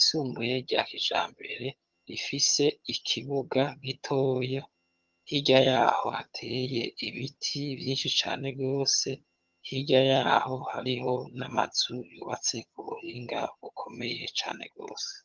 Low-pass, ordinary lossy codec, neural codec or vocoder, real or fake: 7.2 kHz; Opus, 32 kbps; vocoder, 22.05 kHz, 80 mel bands, HiFi-GAN; fake